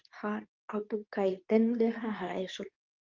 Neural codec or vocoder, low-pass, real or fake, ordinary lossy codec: codec, 24 kHz, 0.9 kbps, WavTokenizer, medium speech release version 2; 7.2 kHz; fake; Opus, 24 kbps